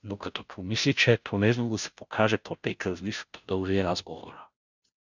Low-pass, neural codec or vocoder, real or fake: 7.2 kHz; codec, 16 kHz, 0.5 kbps, FunCodec, trained on Chinese and English, 25 frames a second; fake